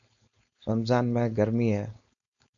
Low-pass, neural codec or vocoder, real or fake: 7.2 kHz; codec, 16 kHz, 4.8 kbps, FACodec; fake